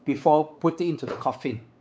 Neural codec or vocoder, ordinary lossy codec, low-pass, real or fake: codec, 16 kHz, 4 kbps, X-Codec, WavLM features, trained on Multilingual LibriSpeech; none; none; fake